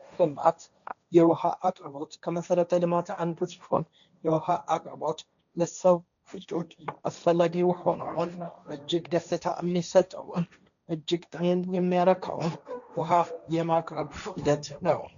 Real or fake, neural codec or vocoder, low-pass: fake; codec, 16 kHz, 1.1 kbps, Voila-Tokenizer; 7.2 kHz